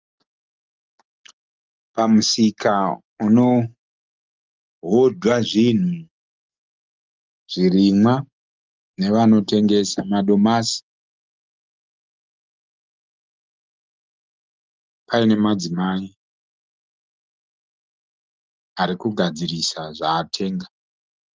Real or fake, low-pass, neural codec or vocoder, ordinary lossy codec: real; 7.2 kHz; none; Opus, 24 kbps